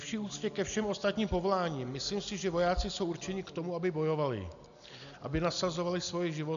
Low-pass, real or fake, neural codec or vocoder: 7.2 kHz; real; none